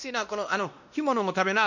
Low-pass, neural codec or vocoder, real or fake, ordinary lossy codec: 7.2 kHz; codec, 16 kHz, 1 kbps, X-Codec, WavLM features, trained on Multilingual LibriSpeech; fake; none